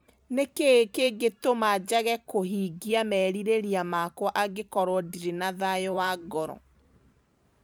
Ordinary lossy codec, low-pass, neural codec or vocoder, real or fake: none; none; vocoder, 44.1 kHz, 128 mel bands every 512 samples, BigVGAN v2; fake